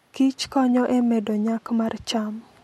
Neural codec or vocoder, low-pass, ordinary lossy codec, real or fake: none; 19.8 kHz; MP3, 64 kbps; real